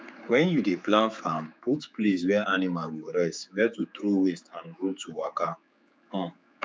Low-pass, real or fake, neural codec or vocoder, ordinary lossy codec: none; fake; codec, 16 kHz, 4 kbps, X-Codec, HuBERT features, trained on general audio; none